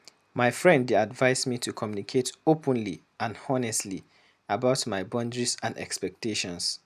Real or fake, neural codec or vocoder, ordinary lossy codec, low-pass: real; none; none; 14.4 kHz